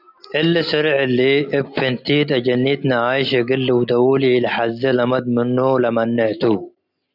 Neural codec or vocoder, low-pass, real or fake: none; 5.4 kHz; real